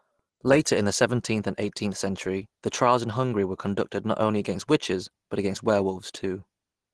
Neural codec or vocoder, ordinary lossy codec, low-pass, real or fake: none; Opus, 16 kbps; 10.8 kHz; real